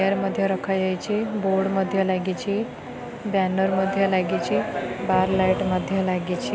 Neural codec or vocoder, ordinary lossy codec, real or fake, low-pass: none; none; real; none